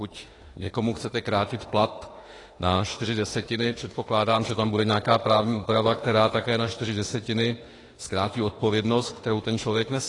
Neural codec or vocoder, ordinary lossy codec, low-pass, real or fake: autoencoder, 48 kHz, 32 numbers a frame, DAC-VAE, trained on Japanese speech; AAC, 32 kbps; 10.8 kHz; fake